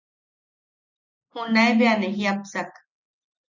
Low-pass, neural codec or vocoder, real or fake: 7.2 kHz; none; real